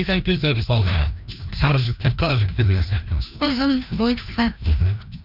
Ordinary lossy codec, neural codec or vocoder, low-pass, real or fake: none; codec, 16 kHz, 1 kbps, FreqCodec, larger model; 5.4 kHz; fake